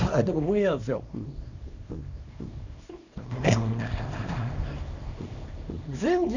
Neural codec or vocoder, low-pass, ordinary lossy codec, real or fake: codec, 24 kHz, 0.9 kbps, WavTokenizer, small release; 7.2 kHz; none; fake